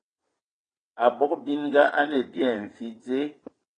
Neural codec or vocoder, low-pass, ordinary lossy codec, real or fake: vocoder, 22.05 kHz, 80 mel bands, WaveNeXt; 9.9 kHz; AAC, 32 kbps; fake